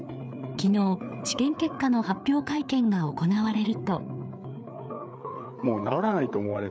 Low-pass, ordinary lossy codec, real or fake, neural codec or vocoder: none; none; fake; codec, 16 kHz, 4 kbps, FreqCodec, larger model